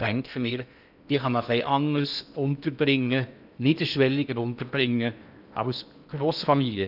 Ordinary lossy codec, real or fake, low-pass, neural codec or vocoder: none; fake; 5.4 kHz; codec, 16 kHz in and 24 kHz out, 0.8 kbps, FocalCodec, streaming, 65536 codes